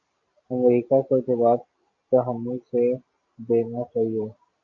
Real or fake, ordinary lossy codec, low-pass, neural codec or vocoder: real; AAC, 64 kbps; 7.2 kHz; none